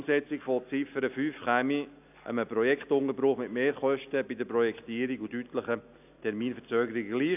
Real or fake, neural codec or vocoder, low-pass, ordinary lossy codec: real; none; 3.6 kHz; AAC, 32 kbps